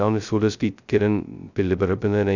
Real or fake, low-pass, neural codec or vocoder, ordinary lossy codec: fake; 7.2 kHz; codec, 16 kHz, 0.2 kbps, FocalCodec; none